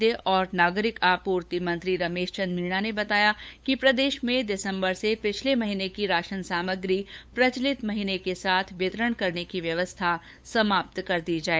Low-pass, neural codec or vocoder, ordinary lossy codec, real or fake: none; codec, 16 kHz, 8 kbps, FunCodec, trained on LibriTTS, 25 frames a second; none; fake